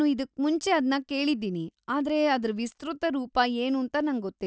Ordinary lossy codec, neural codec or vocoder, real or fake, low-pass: none; none; real; none